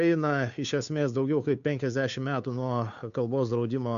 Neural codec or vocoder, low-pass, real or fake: codec, 16 kHz, 2 kbps, FunCodec, trained on Chinese and English, 25 frames a second; 7.2 kHz; fake